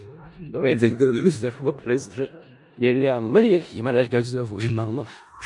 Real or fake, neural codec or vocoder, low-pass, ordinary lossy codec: fake; codec, 16 kHz in and 24 kHz out, 0.4 kbps, LongCat-Audio-Codec, four codebook decoder; 10.8 kHz; AAC, 64 kbps